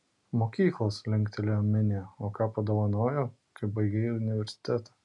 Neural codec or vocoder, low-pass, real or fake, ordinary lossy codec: none; 10.8 kHz; real; MP3, 64 kbps